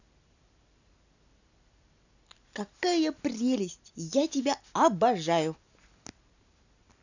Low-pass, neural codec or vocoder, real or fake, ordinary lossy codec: 7.2 kHz; none; real; none